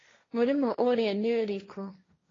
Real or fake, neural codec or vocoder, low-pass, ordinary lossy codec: fake; codec, 16 kHz, 1.1 kbps, Voila-Tokenizer; 7.2 kHz; AAC, 32 kbps